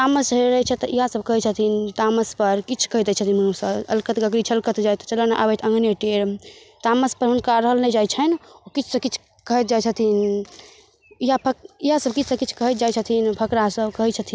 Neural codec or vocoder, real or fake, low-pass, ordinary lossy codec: none; real; none; none